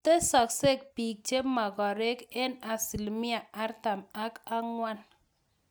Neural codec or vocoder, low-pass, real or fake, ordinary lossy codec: none; none; real; none